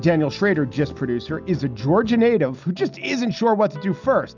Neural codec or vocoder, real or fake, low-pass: none; real; 7.2 kHz